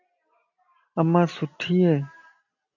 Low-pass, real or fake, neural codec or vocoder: 7.2 kHz; real; none